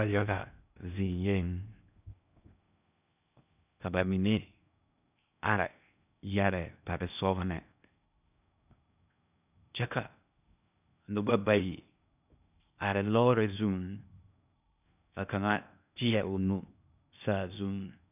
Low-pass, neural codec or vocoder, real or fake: 3.6 kHz; codec, 16 kHz in and 24 kHz out, 0.6 kbps, FocalCodec, streaming, 2048 codes; fake